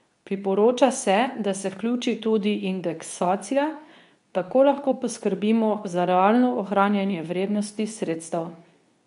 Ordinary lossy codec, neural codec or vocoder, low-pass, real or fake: none; codec, 24 kHz, 0.9 kbps, WavTokenizer, medium speech release version 2; 10.8 kHz; fake